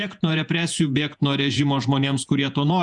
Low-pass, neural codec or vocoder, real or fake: 10.8 kHz; vocoder, 48 kHz, 128 mel bands, Vocos; fake